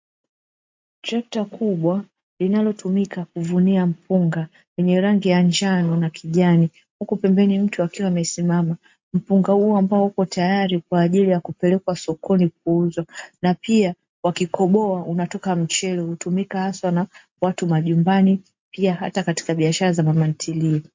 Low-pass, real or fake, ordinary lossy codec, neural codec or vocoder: 7.2 kHz; real; MP3, 48 kbps; none